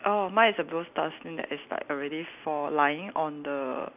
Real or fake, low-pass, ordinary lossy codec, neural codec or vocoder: real; 3.6 kHz; none; none